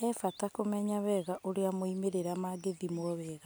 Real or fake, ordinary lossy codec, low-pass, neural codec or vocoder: real; none; none; none